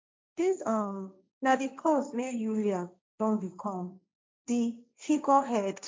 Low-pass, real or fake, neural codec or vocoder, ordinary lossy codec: none; fake; codec, 16 kHz, 1.1 kbps, Voila-Tokenizer; none